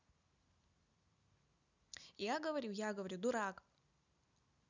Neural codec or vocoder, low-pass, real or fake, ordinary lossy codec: none; 7.2 kHz; real; none